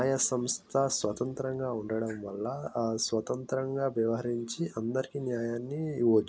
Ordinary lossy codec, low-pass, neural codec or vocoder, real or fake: none; none; none; real